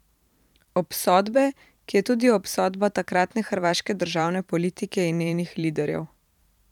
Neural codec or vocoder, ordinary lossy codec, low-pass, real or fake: vocoder, 44.1 kHz, 128 mel bands every 512 samples, BigVGAN v2; none; 19.8 kHz; fake